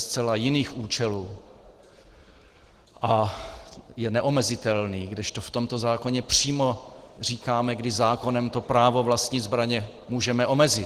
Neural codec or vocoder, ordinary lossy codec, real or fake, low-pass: none; Opus, 16 kbps; real; 14.4 kHz